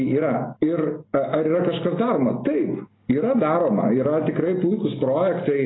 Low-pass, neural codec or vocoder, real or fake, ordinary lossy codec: 7.2 kHz; none; real; AAC, 16 kbps